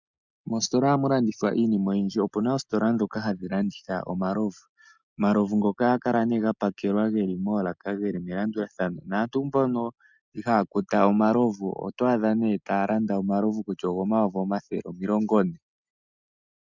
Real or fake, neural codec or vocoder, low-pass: real; none; 7.2 kHz